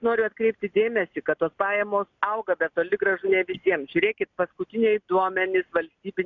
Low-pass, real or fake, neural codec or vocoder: 7.2 kHz; real; none